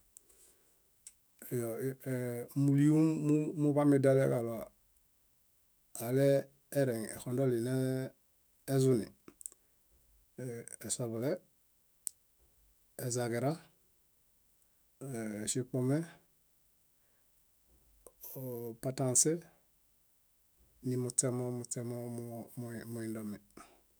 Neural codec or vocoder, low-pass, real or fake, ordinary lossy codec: autoencoder, 48 kHz, 128 numbers a frame, DAC-VAE, trained on Japanese speech; none; fake; none